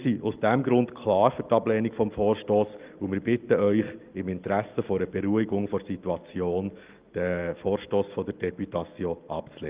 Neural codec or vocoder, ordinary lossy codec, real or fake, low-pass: none; Opus, 32 kbps; real; 3.6 kHz